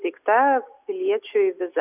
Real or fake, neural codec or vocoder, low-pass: real; none; 3.6 kHz